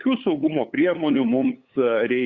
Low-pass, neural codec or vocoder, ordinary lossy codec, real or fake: 7.2 kHz; codec, 16 kHz, 8 kbps, FunCodec, trained on LibriTTS, 25 frames a second; Opus, 64 kbps; fake